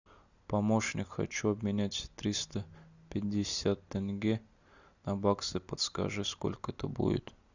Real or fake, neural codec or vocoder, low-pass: real; none; 7.2 kHz